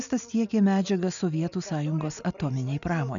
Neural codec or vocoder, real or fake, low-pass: none; real; 7.2 kHz